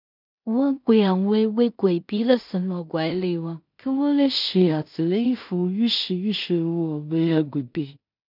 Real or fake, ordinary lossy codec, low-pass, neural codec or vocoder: fake; none; 5.4 kHz; codec, 16 kHz in and 24 kHz out, 0.4 kbps, LongCat-Audio-Codec, two codebook decoder